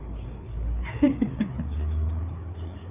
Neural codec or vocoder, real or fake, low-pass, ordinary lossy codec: codec, 16 kHz, 4 kbps, FreqCodec, larger model; fake; 3.6 kHz; none